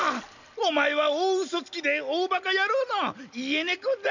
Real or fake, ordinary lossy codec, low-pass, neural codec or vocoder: real; none; 7.2 kHz; none